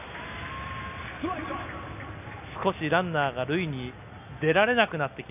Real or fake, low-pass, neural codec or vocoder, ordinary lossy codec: real; 3.6 kHz; none; none